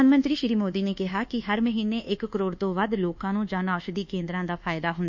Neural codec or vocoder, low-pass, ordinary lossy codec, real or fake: codec, 24 kHz, 1.2 kbps, DualCodec; 7.2 kHz; none; fake